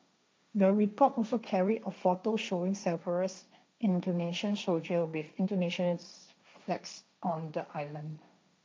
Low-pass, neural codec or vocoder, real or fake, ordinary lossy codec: none; codec, 16 kHz, 1.1 kbps, Voila-Tokenizer; fake; none